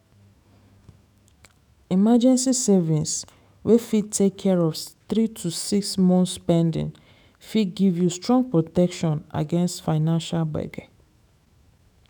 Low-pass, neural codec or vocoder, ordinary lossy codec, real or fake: 19.8 kHz; autoencoder, 48 kHz, 128 numbers a frame, DAC-VAE, trained on Japanese speech; none; fake